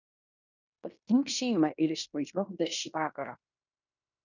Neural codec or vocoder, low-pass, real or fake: codec, 16 kHz in and 24 kHz out, 0.9 kbps, LongCat-Audio-Codec, fine tuned four codebook decoder; 7.2 kHz; fake